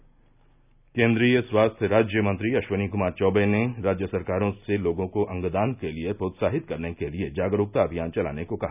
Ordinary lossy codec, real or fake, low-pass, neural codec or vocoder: none; real; 3.6 kHz; none